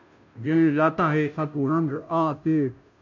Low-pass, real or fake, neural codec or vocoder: 7.2 kHz; fake; codec, 16 kHz, 0.5 kbps, FunCodec, trained on Chinese and English, 25 frames a second